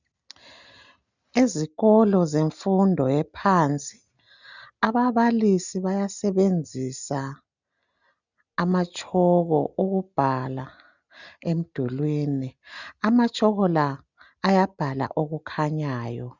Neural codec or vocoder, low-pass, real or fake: none; 7.2 kHz; real